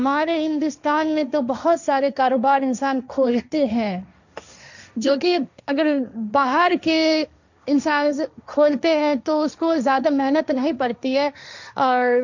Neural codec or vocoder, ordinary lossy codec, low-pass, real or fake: codec, 16 kHz, 1.1 kbps, Voila-Tokenizer; none; 7.2 kHz; fake